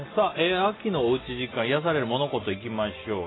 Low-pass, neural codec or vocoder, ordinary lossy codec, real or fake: 7.2 kHz; none; AAC, 16 kbps; real